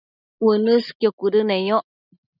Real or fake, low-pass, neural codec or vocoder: real; 5.4 kHz; none